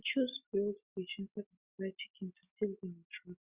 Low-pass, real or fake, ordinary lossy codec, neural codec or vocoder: 3.6 kHz; real; Opus, 64 kbps; none